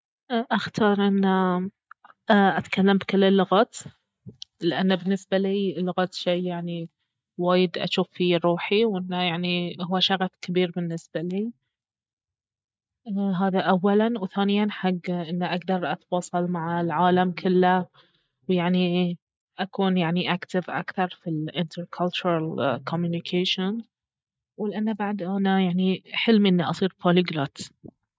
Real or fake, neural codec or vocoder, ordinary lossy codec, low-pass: real; none; none; none